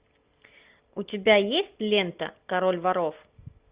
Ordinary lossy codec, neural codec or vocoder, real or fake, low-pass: Opus, 64 kbps; none; real; 3.6 kHz